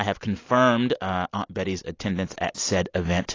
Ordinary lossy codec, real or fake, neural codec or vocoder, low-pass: AAC, 32 kbps; real; none; 7.2 kHz